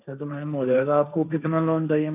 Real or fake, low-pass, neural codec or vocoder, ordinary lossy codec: fake; 3.6 kHz; codec, 16 kHz, 1.1 kbps, Voila-Tokenizer; none